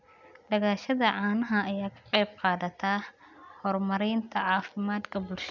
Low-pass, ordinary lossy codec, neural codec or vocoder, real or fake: 7.2 kHz; none; none; real